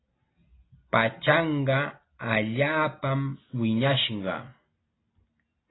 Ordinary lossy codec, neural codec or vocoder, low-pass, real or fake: AAC, 16 kbps; none; 7.2 kHz; real